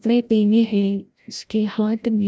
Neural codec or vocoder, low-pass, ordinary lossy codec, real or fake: codec, 16 kHz, 0.5 kbps, FreqCodec, larger model; none; none; fake